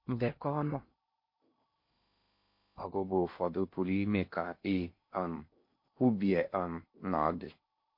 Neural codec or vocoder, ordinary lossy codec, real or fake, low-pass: codec, 16 kHz in and 24 kHz out, 0.6 kbps, FocalCodec, streaming, 2048 codes; MP3, 32 kbps; fake; 5.4 kHz